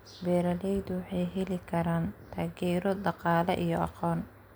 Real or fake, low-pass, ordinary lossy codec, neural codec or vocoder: real; none; none; none